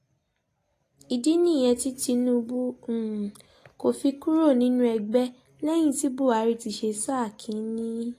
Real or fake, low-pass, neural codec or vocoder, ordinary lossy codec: real; 14.4 kHz; none; AAC, 48 kbps